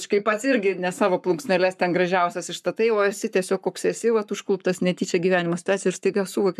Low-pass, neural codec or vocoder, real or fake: 14.4 kHz; codec, 44.1 kHz, 7.8 kbps, Pupu-Codec; fake